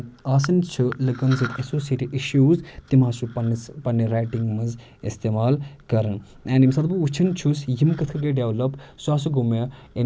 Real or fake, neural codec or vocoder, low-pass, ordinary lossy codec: real; none; none; none